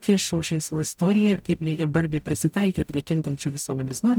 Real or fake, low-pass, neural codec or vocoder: fake; 19.8 kHz; codec, 44.1 kHz, 0.9 kbps, DAC